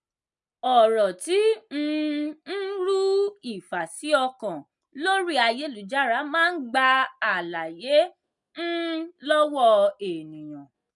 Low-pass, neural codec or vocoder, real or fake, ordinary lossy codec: 10.8 kHz; none; real; none